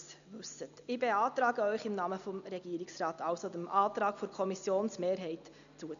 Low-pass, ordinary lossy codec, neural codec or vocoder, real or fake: 7.2 kHz; none; none; real